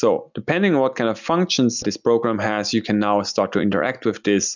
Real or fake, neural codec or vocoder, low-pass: real; none; 7.2 kHz